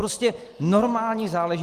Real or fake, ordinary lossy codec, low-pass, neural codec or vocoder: real; Opus, 24 kbps; 14.4 kHz; none